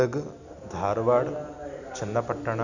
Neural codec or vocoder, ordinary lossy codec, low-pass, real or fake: none; none; 7.2 kHz; real